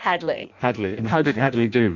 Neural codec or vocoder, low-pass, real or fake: codec, 16 kHz in and 24 kHz out, 0.6 kbps, FireRedTTS-2 codec; 7.2 kHz; fake